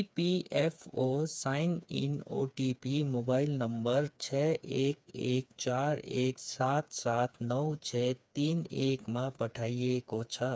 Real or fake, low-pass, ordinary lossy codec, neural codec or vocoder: fake; none; none; codec, 16 kHz, 4 kbps, FreqCodec, smaller model